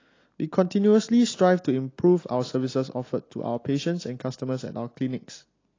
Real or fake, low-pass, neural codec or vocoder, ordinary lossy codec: real; 7.2 kHz; none; AAC, 32 kbps